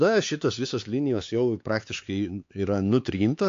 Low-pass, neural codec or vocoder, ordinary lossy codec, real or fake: 7.2 kHz; codec, 16 kHz, 4 kbps, X-Codec, HuBERT features, trained on LibriSpeech; AAC, 48 kbps; fake